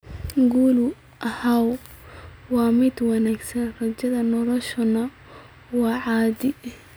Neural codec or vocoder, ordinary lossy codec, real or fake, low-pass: none; none; real; none